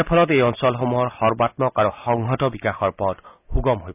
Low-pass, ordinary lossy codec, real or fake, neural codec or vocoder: 3.6 kHz; none; real; none